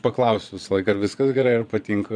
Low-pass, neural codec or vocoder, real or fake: 9.9 kHz; vocoder, 22.05 kHz, 80 mel bands, Vocos; fake